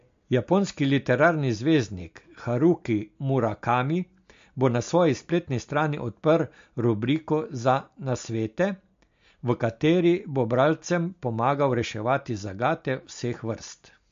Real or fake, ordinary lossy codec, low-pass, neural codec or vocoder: real; MP3, 48 kbps; 7.2 kHz; none